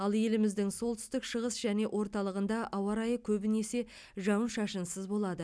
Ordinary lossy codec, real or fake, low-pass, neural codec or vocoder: none; real; none; none